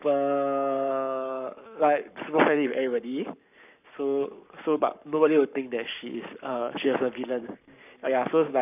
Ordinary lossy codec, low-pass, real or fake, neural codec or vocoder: none; 3.6 kHz; fake; codec, 44.1 kHz, 7.8 kbps, Pupu-Codec